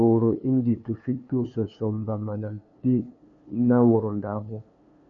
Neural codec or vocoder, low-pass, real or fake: codec, 16 kHz, 2 kbps, FunCodec, trained on LibriTTS, 25 frames a second; 7.2 kHz; fake